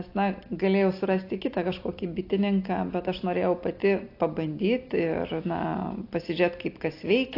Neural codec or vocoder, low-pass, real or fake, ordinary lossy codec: none; 5.4 kHz; real; AAC, 32 kbps